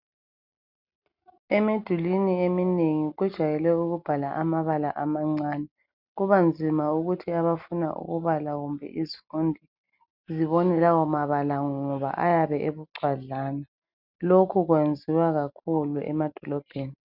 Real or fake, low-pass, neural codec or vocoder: real; 5.4 kHz; none